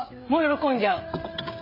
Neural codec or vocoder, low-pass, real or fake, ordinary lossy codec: codec, 16 kHz, 16 kbps, FreqCodec, smaller model; 5.4 kHz; fake; MP3, 24 kbps